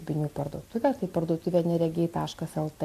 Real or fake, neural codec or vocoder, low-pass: real; none; 14.4 kHz